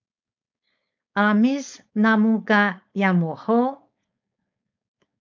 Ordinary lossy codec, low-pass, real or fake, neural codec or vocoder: MP3, 64 kbps; 7.2 kHz; fake; codec, 16 kHz, 4.8 kbps, FACodec